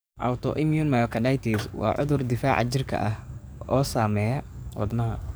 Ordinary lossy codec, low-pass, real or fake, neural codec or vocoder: none; none; fake; codec, 44.1 kHz, 7.8 kbps, DAC